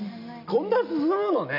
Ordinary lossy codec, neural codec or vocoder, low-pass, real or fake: none; none; 5.4 kHz; real